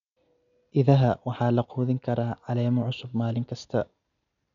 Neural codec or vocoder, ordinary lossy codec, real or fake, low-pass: none; none; real; 7.2 kHz